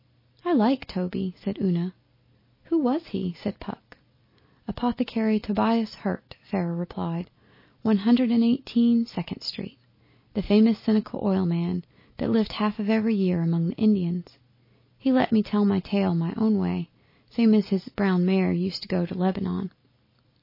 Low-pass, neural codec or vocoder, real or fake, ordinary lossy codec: 5.4 kHz; none; real; MP3, 24 kbps